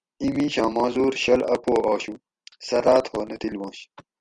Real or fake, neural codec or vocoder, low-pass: real; none; 9.9 kHz